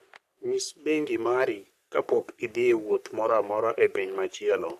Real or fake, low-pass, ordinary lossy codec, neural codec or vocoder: fake; 14.4 kHz; none; codec, 44.1 kHz, 3.4 kbps, Pupu-Codec